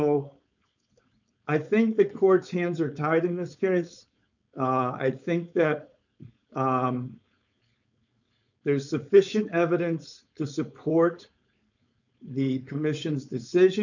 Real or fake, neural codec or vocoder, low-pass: fake; codec, 16 kHz, 4.8 kbps, FACodec; 7.2 kHz